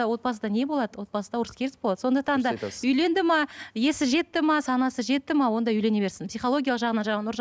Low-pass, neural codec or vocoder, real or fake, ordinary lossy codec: none; none; real; none